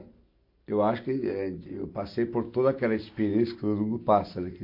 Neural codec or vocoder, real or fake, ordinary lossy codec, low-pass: none; real; MP3, 32 kbps; 5.4 kHz